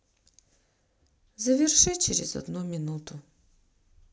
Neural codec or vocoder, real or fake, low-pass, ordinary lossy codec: none; real; none; none